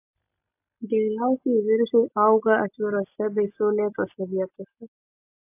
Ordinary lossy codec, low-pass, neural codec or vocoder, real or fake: AAC, 32 kbps; 3.6 kHz; none; real